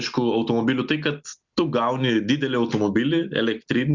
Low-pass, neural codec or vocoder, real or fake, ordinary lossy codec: 7.2 kHz; none; real; Opus, 64 kbps